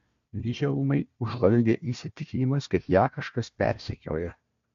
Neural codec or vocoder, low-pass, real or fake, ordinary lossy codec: codec, 16 kHz, 1 kbps, FunCodec, trained on Chinese and English, 50 frames a second; 7.2 kHz; fake; MP3, 48 kbps